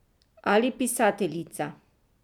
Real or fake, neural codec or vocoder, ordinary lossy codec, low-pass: real; none; none; 19.8 kHz